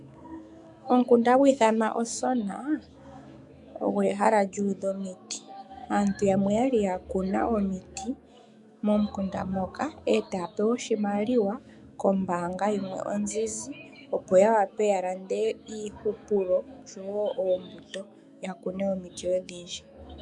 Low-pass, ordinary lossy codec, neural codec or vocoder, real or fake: 10.8 kHz; MP3, 96 kbps; autoencoder, 48 kHz, 128 numbers a frame, DAC-VAE, trained on Japanese speech; fake